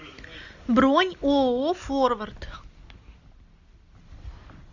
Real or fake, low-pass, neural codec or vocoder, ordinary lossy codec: real; 7.2 kHz; none; Opus, 64 kbps